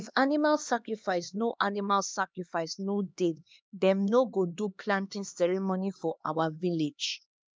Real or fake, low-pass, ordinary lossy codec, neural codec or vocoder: fake; none; none; codec, 16 kHz, 2 kbps, X-Codec, HuBERT features, trained on LibriSpeech